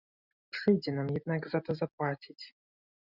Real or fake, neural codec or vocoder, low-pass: real; none; 5.4 kHz